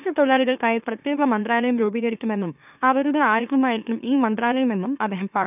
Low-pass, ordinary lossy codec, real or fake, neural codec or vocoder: 3.6 kHz; none; fake; autoencoder, 44.1 kHz, a latent of 192 numbers a frame, MeloTTS